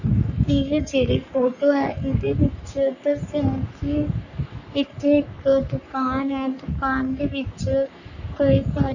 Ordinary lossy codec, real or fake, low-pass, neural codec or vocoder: none; fake; 7.2 kHz; codec, 44.1 kHz, 3.4 kbps, Pupu-Codec